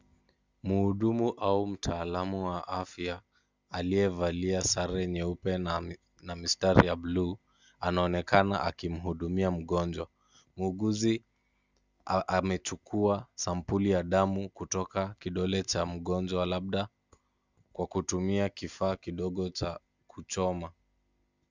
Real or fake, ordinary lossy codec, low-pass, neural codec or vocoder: real; Opus, 64 kbps; 7.2 kHz; none